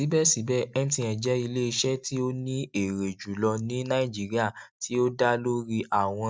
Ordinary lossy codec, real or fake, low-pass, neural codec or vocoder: none; real; none; none